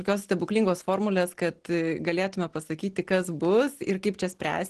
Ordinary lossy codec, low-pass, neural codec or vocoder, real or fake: Opus, 16 kbps; 10.8 kHz; none; real